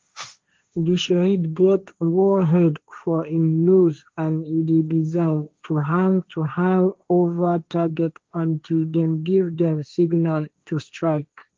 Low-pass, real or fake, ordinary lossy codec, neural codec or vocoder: 7.2 kHz; fake; Opus, 32 kbps; codec, 16 kHz, 1.1 kbps, Voila-Tokenizer